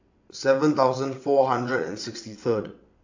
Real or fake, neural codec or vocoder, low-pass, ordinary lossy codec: fake; vocoder, 22.05 kHz, 80 mel bands, WaveNeXt; 7.2 kHz; AAC, 48 kbps